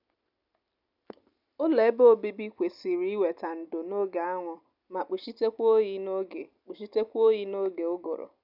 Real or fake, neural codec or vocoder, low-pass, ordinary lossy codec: real; none; 5.4 kHz; none